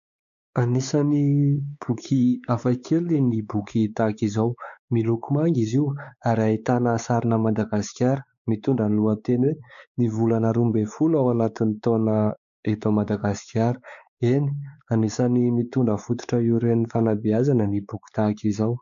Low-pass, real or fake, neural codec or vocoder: 7.2 kHz; fake; codec, 16 kHz, 4 kbps, X-Codec, WavLM features, trained on Multilingual LibriSpeech